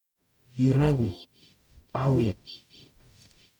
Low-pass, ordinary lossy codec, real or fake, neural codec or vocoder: 19.8 kHz; none; fake; codec, 44.1 kHz, 0.9 kbps, DAC